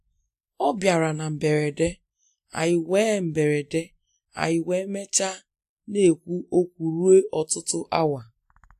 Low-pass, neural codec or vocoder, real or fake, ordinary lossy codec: 14.4 kHz; none; real; AAC, 64 kbps